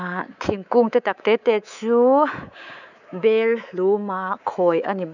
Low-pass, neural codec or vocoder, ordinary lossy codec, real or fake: 7.2 kHz; codec, 24 kHz, 3.1 kbps, DualCodec; none; fake